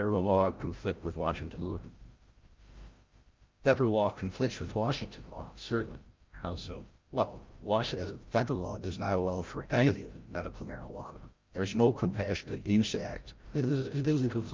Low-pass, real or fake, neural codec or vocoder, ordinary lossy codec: 7.2 kHz; fake; codec, 16 kHz, 0.5 kbps, FreqCodec, larger model; Opus, 24 kbps